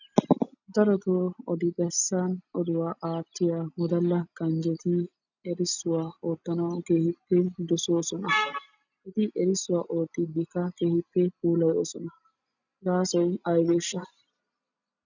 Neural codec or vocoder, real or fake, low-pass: none; real; 7.2 kHz